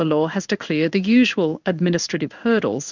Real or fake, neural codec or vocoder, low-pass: fake; codec, 16 kHz in and 24 kHz out, 1 kbps, XY-Tokenizer; 7.2 kHz